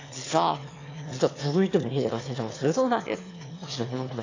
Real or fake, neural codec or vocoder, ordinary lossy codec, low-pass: fake; autoencoder, 22.05 kHz, a latent of 192 numbers a frame, VITS, trained on one speaker; AAC, 32 kbps; 7.2 kHz